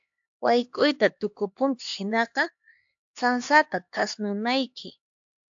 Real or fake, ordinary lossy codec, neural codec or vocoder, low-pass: fake; AAC, 64 kbps; codec, 16 kHz, 2 kbps, X-Codec, HuBERT features, trained on LibriSpeech; 7.2 kHz